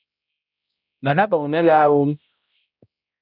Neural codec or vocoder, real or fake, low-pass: codec, 16 kHz, 0.5 kbps, X-Codec, HuBERT features, trained on balanced general audio; fake; 5.4 kHz